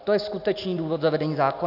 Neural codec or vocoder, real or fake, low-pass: none; real; 5.4 kHz